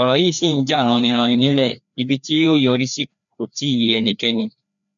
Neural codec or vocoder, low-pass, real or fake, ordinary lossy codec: codec, 16 kHz, 2 kbps, FreqCodec, larger model; 7.2 kHz; fake; none